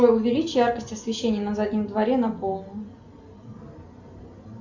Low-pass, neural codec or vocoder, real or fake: 7.2 kHz; none; real